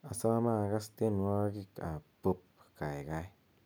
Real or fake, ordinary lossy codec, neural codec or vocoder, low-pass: real; none; none; none